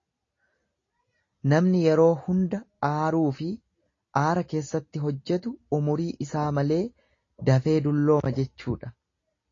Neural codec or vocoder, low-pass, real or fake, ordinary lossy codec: none; 7.2 kHz; real; AAC, 32 kbps